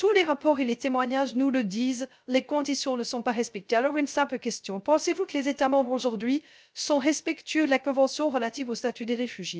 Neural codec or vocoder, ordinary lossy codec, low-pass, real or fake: codec, 16 kHz, 0.3 kbps, FocalCodec; none; none; fake